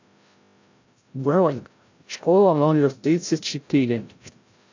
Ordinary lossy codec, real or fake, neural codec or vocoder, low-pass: AAC, 48 kbps; fake; codec, 16 kHz, 0.5 kbps, FreqCodec, larger model; 7.2 kHz